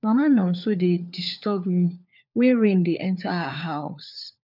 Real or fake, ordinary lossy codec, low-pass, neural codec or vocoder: fake; none; 5.4 kHz; codec, 16 kHz, 4 kbps, FunCodec, trained on LibriTTS, 50 frames a second